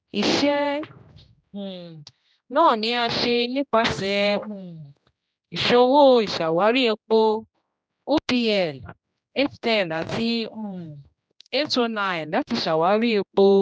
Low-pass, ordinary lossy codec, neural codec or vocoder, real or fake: none; none; codec, 16 kHz, 1 kbps, X-Codec, HuBERT features, trained on general audio; fake